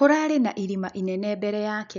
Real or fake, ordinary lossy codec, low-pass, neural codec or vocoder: real; none; 7.2 kHz; none